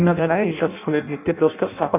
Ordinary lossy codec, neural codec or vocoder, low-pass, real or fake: none; codec, 16 kHz in and 24 kHz out, 0.6 kbps, FireRedTTS-2 codec; 3.6 kHz; fake